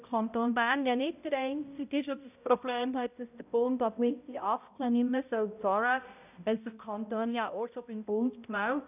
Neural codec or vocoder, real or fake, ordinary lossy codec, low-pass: codec, 16 kHz, 0.5 kbps, X-Codec, HuBERT features, trained on balanced general audio; fake; none; 3.6 kHz